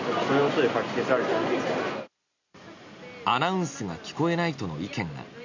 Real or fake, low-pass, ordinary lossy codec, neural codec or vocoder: real; 7.2 kHz; none; none